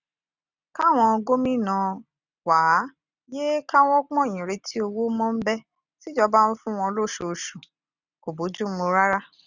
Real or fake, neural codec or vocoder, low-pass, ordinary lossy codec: real; none; 7.2 kHz; none